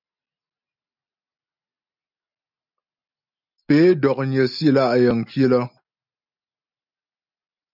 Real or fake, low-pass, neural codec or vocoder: real; 5.4 kHz; none